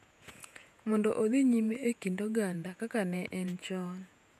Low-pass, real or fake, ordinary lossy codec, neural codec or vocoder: 14.4 kHz; fake; none; vocoder, 48 kHz, 128 mel bands, Vocos